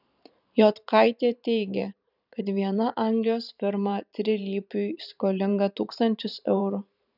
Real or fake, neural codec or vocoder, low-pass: fake; vocoder, 44.1 kHz, 128 mel bands every 512 samples, BigVGAN v2; 5.4 kHz